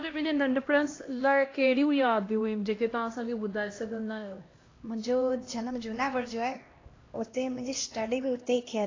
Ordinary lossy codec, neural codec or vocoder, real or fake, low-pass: AAC, 32 kbps; codec, 16 kHz, 1 kbps, X-Codec, HuBERT features, trained on LibriSpeech; fake; 7.2 kHz